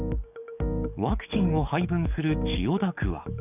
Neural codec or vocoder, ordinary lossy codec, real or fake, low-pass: codec, 44.1 kHz, 7.8 kbps, DAC; none; fake; 3.6 kHz